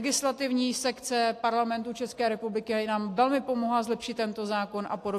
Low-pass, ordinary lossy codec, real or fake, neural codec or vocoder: 14.4 kHz; AAC, 64 kbps; real; none